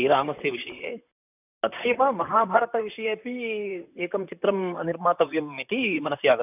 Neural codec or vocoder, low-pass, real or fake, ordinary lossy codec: vocoder, 44.1 kHz, 128 mel bands, Pupu-Vocoder; 3.6 kHz; fake; none